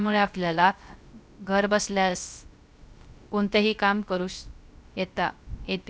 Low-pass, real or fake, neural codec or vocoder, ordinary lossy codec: none; fake; codec, 16 kHz, 0.3 kbps, FocalCodec; none